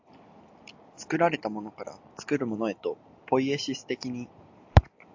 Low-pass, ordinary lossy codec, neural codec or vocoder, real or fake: 7.2 kHz; AAC, 48 kbps; none; real